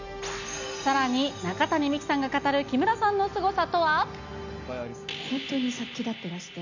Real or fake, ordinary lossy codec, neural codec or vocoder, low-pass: real; none; none; 7.2 kHz